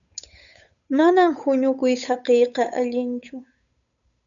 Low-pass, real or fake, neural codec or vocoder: 7.2 kHz; fake; codec, 16 kHz, 8 kbps, FunCodec, trained on Chinese and English, 25 frames a second